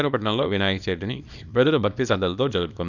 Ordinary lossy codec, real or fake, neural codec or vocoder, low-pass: none; fake; codec, 24 kHz, 0.9 kbps, WavTokenizer, small release; 7.2 kHz